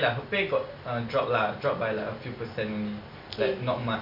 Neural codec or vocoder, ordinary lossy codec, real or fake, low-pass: none; none; real; 5.4 kHz